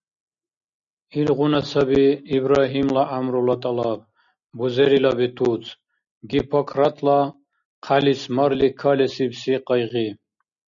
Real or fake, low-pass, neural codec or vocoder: real; 7.2 kHz; none